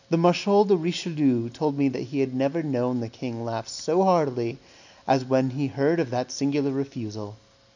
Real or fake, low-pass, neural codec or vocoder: real; 7.2 kHz; none